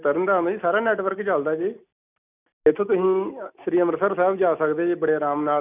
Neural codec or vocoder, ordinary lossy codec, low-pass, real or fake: none; none; 3.6 kHz; real